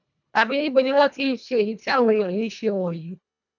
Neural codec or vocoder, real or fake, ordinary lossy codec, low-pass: codec, 24 kHz, 1.5 kbps, HILCodec; fake; none; 7.2 kHz